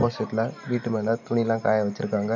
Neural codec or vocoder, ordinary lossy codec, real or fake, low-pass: none; none; real; 7.2 kHz